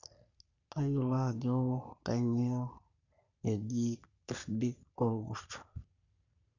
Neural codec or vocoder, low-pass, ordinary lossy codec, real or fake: codec, 44.1 kHz, 3.4 kbps, Pupu-Codec; 7.2 kHz; none; fake